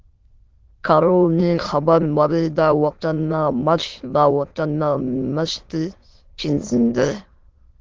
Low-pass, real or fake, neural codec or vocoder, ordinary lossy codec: 7.2 kHz; fake; autoencoder, 22.05 kHz, a latent of 192 numbers a frame, VITS, trained on many speakers; Opus, 16 kbps